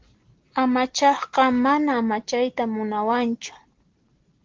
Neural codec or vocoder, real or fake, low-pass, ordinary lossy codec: none; real; 7.2 kHz; Opus, 16 kbps